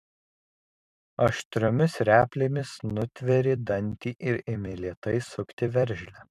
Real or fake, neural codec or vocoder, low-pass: fake; vocoder, 44.1 kHz, 128 mel bands every 256 samples, BigVGAN v2; 14.4 kHz